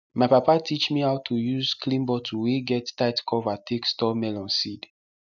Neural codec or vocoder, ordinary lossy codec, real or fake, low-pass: none; none; real; 7.2 kHz